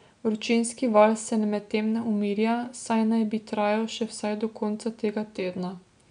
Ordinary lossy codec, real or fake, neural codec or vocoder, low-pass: none; real; none; 9.9 kHz